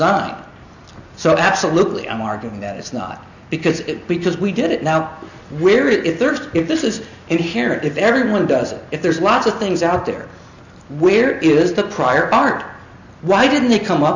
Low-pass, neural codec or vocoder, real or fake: 7.2 kHz; none; real